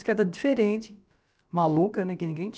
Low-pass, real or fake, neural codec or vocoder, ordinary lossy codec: none; fake; codec, 16 kHz, about 1 kbps, DyCAST, with the encoder's durations; none